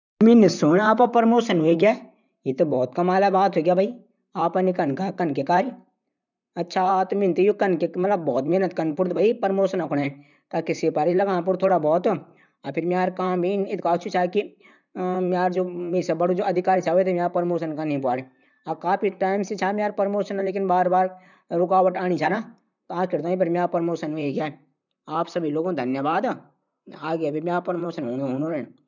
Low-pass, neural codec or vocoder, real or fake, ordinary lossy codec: 7.2 kHz; vocoder, 44.1 kHz, 128 mel bands, Pupu-Vocoder; fake; none